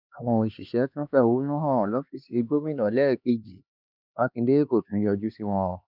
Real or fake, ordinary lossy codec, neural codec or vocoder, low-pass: fake; none; codec, 16 kHz, 2 kbps, X-Codec, HuBERT features, trained on LibriSpeech; 5.4 kHz